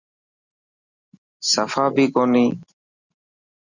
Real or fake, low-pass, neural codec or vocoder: real; 7.2 kHz; none